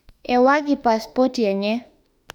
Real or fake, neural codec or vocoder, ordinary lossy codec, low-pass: fake; autoencoder, 48 kHz, 32 numbers a frame, DAC-VAE, trained on Japanese speech; none; 19.8 kHz